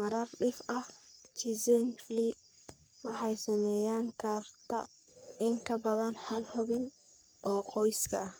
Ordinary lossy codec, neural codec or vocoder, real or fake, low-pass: none; codec, 44.1 kHz, 3.4 kbps, Pupu-Codec; fake; none